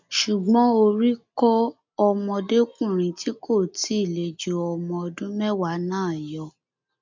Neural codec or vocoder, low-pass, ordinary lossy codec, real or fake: none; 7.2 kHz; MP3, 64 kbps; real